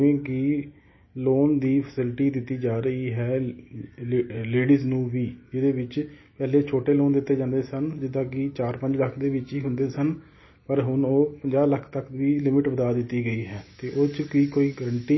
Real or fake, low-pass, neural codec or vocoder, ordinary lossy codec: real; 7.2 kHz; none; MP3, 24 kbps